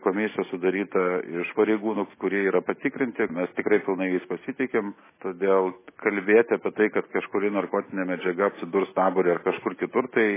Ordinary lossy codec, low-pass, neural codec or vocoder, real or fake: MP3, 16 kbps; 3.6 kHz; none; real